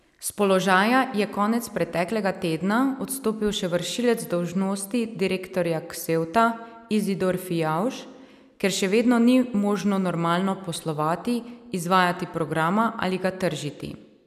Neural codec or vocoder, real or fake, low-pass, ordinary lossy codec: none; real; 14.4 kHz; none